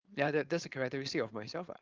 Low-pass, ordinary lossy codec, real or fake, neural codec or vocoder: 7.2 kHz; Opus, 24 kbps; fake; codec, 16 kHz, 4.8 kbps, FACodec